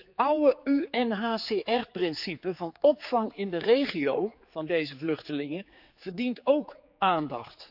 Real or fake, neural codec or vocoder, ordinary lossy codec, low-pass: fake; codec, 16 kHz, 4 kbps, X-Codec, HuBERT features, trained on general audio; none; 5.4 kHz